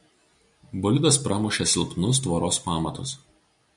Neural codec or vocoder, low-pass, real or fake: none; 10.8 kHz; real